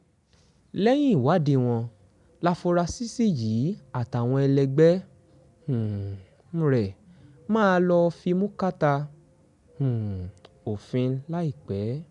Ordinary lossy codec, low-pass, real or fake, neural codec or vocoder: none; 10.8 kHz; real; none